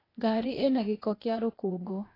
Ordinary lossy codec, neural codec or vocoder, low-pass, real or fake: AAC, 24 kbps; codec, 16 kHz, 0.8 kbps, ZipCodec; 5.4 kHz; fake